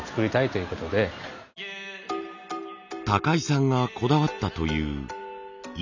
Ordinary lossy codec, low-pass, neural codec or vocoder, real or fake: none; 7.2 kHz; none; real